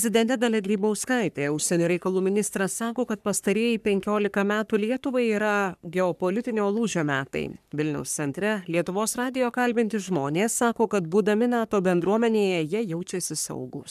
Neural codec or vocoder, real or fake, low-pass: codec, 44.1 kHz, 3.4 kbps, Pupu-Codec; fake; 14.4 kHz